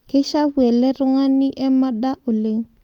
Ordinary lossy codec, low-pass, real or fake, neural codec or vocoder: Opus, 32 kbps; 19.8 kHz; real; none